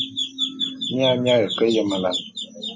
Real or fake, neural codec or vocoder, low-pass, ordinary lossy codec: real; none; 7.2 kHz; MP3, 32 kbps